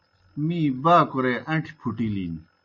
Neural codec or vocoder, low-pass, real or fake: none; 7.2 kHz; real